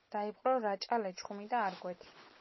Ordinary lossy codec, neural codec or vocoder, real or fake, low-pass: MP3, 24 kbps; none; real; 7.2 kHz